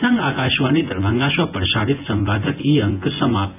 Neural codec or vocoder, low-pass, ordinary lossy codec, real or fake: vocoder, 24 kHz, 100 mel bands, Vocos; 3.6 kHz; AAC, 24 kbps; fake